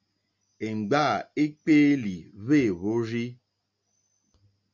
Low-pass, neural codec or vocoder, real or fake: 7.2 kHz; none; real